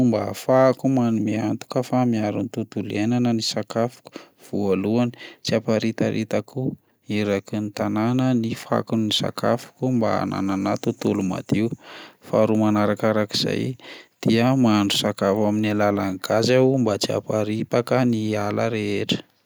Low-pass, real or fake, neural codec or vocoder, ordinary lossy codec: none; real; none; none